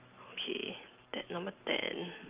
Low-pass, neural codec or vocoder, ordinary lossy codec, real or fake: 3.6 kHz; none; Opus, 24 kbps; real